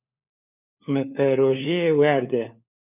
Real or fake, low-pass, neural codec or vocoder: fake; 3.6 kHz; codec, 16 kHz, 4 kbps, FunCodec, trained on LibriTTS, 50 frames a second